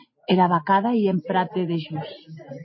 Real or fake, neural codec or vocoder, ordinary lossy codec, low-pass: real; none; MP3, 24 kbps; 7.2 kHz